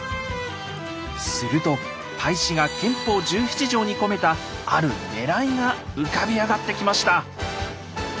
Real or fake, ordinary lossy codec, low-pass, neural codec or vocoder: real; none; none; none